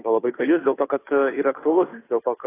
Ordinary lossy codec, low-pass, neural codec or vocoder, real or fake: AAC, 16 kbps; 3.6 kHz; codec, 16 kHz, 2 kbps, FunCodec, trained on Chinese and English, 25 frames a second; fake